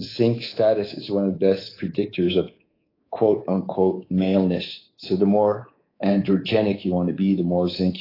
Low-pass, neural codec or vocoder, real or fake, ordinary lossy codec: 5.4 kHz; codec, 24 kHz, 3.1 kbps, DualCodec; fake; AAC, 24 kbps